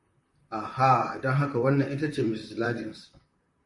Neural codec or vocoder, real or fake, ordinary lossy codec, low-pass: vocoder, 44.1 kHz, 128 mel bands, Pupu-Vocoder; fake; MP3, 48 kbps; 10.8 kHz